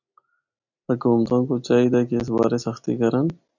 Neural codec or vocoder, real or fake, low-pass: none; real; 7.2 kHz